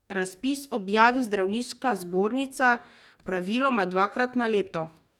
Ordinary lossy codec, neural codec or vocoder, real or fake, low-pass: none; codec, 44.1 kHz, 2.6 kbps, DAC; fake; 19.8 kHz